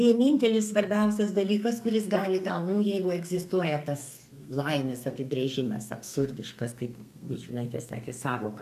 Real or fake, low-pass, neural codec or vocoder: fake; 14.4 kHz; codec, 32 kHz, 1.9 kbps, SNAC